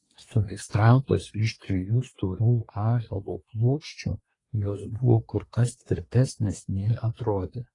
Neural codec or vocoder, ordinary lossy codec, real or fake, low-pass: codec, 24 kHz, 1 kbps, SNAC; AAC, 32 kbps; fake; 10.8 kHz